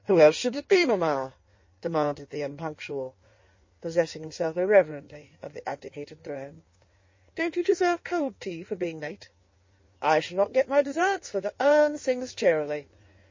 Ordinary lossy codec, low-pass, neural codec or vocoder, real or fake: MP3, 32 kbps; 7.2 kHz; codec, 16 kHz in and 24 kHz out, 1.1 kbps, FireRedTTS-2 codec; fake